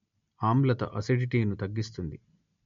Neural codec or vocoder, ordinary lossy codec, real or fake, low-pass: none; MP3, 48 kbps; real; 7.2 kHz